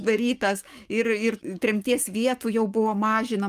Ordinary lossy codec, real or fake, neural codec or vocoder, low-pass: Opus, 32 kbps; fake; codec, 44.1 kHz, 7.8 kbps, DAC; 14.4 kHz